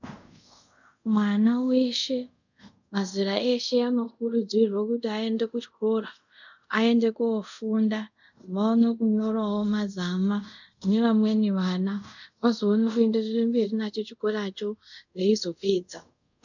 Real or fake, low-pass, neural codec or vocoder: fake; 7.2 kHz; codec, 24 kHz, 0.5 kbps, DualCodec